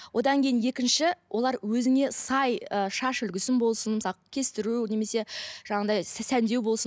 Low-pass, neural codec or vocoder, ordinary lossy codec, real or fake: none; none; none; real